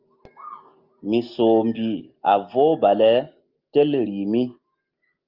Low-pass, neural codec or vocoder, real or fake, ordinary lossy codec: 5.4 kHz; none; real; Opus, 24 kbps